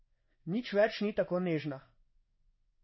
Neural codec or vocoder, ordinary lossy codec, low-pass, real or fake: codec, 16 kHz in and 24 kHz out, 1 kbps, XY-Tokenizer; MP3, 24 kbps; 7.2 kHz; fake